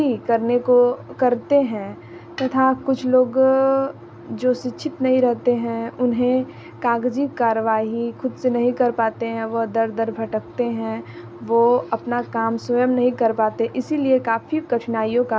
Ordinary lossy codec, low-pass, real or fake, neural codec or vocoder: none; none; real; none